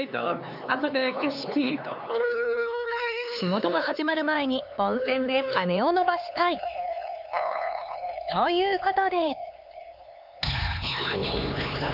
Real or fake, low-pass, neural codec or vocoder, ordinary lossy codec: fake; 5.4 kHz; codec, 16 kHz, 4 kbps, X-Codec, HuBERT features, trained on LibriSpeech; none